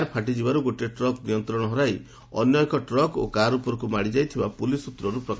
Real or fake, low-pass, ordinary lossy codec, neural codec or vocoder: real; none; none; none